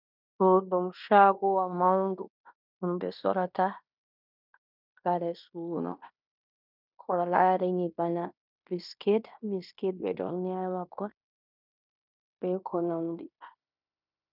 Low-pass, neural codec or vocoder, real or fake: 5.4 kHz; codec, 16 kHz in and 24 kHz out, 0.9 kbps, LongCat-Audio-Codec, fine tuned four codebook decoder; fake